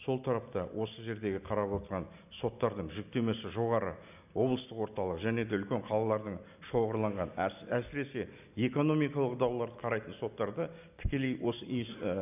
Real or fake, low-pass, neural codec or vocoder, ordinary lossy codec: real; 3.6 kHz; none; none